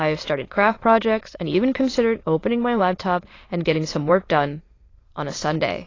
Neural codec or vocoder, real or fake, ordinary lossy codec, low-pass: autoencoder, 22.05 kHz, a latent of 192 numbers a frame, VITS, trained on many speakers; fake; AAC, 32 kbps; 7.2 kHz